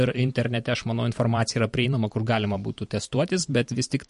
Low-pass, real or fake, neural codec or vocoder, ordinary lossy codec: 14.4 kHz; fake; vocoder, 44.1 kHz, 128 mel bands every 512 samples, BigVGAN v2; MP3, 48 kbps